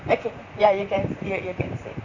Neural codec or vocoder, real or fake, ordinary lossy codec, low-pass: vocoder, 44.1 kHz, 128 mel bands, Pupu-Vocoder; fake; none; 7.2 kHz